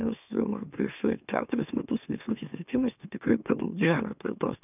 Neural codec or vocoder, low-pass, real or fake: autoencoder, 44.1 kHz, a latent of 192 numbers a frame, MeloTTS; 3.6 kHz; fake